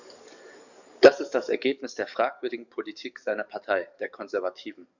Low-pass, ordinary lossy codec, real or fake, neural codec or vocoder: 7.2 kHz; none; fake; codec, 44.1 kHz, 7.8 kbps, DAC